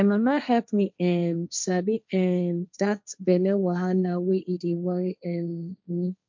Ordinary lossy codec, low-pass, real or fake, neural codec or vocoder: MP3, 64 kbps; 7.2 kHz; fake; codec, 16 kHz, 1.1 kbps, Voila-Tokenizer